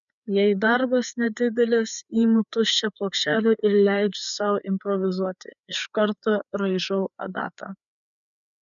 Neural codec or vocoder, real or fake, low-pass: codec, 16 kHz, 4 kbps, FreqCodec, larger model; fake; 7.2 kHz